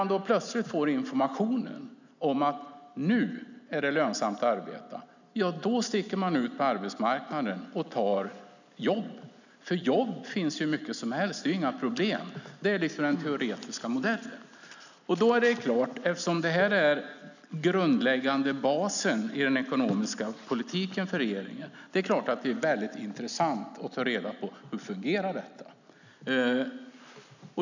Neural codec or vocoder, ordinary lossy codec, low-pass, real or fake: none; none; 7.2 kHz; real